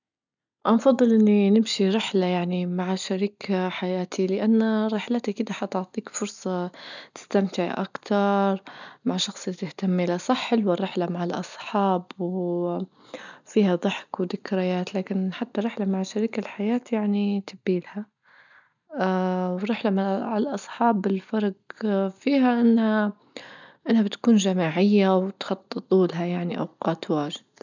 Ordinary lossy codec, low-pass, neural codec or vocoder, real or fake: none; 7.2 kHz; none; real